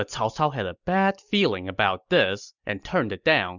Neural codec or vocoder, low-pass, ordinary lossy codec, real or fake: none; 7.2 kHz; Opus, 64 kbps; real